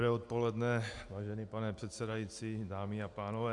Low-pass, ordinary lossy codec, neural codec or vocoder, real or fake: 10.8 kHz; AAC, 64 kbps; none; real